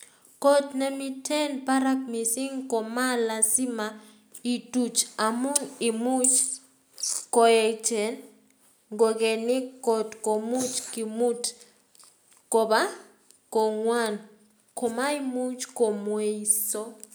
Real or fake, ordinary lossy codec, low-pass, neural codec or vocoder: real; none; none; none